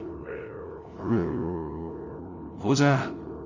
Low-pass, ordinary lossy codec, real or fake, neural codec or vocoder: 7.2 kHz; none; fake; codec, 16 kHz, 0.5 kbps, FunCodec, trained on LibriTTS, 25 frames a second